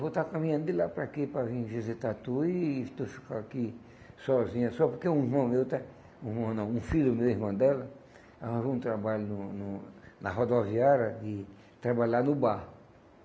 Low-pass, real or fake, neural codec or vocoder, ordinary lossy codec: none; real; none; none